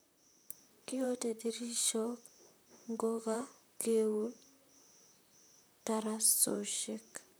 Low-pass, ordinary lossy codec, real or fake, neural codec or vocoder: none; none; fake; vocoder, 44.1 kHz, 128 mel bands, Pupu-Vocoder